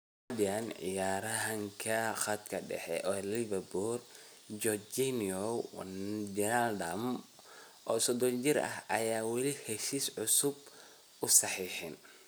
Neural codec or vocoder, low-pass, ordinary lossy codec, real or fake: none; none; none; real